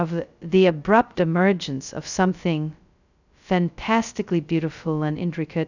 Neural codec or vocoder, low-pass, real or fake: codec, 16 kHz, 0.2 kbps, FocalCodec; 7.2 kHz; fake